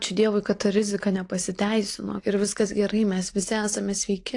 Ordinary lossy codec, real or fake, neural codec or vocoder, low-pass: AAC, 48 kbps; real; none; 10.8 kHz